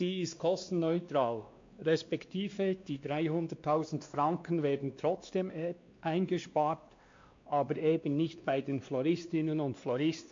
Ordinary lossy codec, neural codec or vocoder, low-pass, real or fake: MP3, 48 kbps; codec, 16 kHz, 2 kbps, X-Codec, WavLM features, trained on Multilingual LibriSpeech; 7.2 kHz; fake